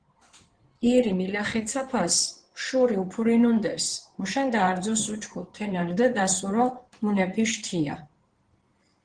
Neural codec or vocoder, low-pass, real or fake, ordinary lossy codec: codec, 16 kHz in and 24 kHz out, 2.2 kbps, FireRedTTS-2 codec; 9.9 kHz; fake; Opus, 16 kbps